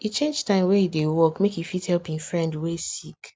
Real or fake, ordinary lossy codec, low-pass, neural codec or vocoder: real; none; none; none